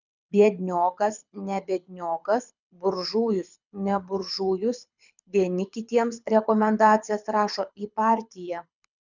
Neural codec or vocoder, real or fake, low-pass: codec, 24 kHz, 6 kbps, HILCodec; fake; 7.2 kHz